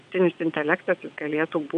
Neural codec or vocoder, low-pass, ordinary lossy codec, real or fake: vocoder, 22.05 kHz, 80 mel bands, WaveNeXt; 9.9 kHz; AAC, 96 kbps; fake